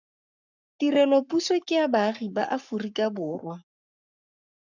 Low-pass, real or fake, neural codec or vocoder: 7.2 kHz; fake; codec, 44.1 kHz, 7.8 kbps, Pupu-Codec